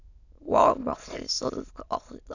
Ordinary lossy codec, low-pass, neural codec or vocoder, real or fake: none; 7.2 kHz; autoencoder, 22.05 kHz, a latent of 192 numbers a frame, VITS, trained on many speakers; fake